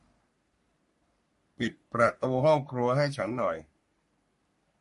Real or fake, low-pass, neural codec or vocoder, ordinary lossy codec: fake; 14.4 kHz; codec, 44.1 kHz, 3.4 kbps, Pupu-Codec; MP3, 48 kbps